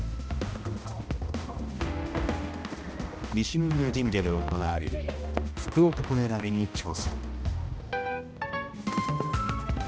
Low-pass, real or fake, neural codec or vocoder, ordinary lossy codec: none; fake; codec, 16 kHz, 1 kbps, X-Codec, HuBERT features, trained on balanced general audio; none